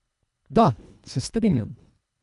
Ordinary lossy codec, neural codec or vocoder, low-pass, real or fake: none; codec, 24 kHz, 1.5 kbps, HILCodec; 10.8 kHz; fake